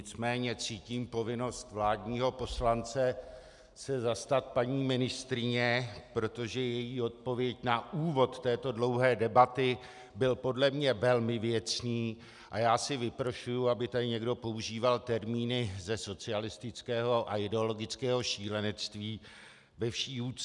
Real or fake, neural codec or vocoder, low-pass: real; none; 10.8 kHz